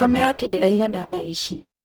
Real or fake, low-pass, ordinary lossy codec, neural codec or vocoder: fake; none; none; codec, 44.1 kHz, 0.9 kbps, DAC